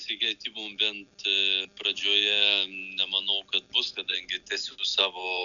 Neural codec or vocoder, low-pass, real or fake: none; 7.2 kHz; real